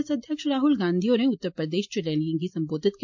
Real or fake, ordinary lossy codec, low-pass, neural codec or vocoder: real; MP3, 48 kbps; 7.2 kHz; none